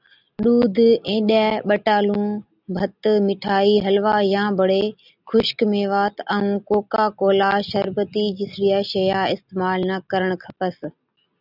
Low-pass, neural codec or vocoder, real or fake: 5.4 kHz; none; real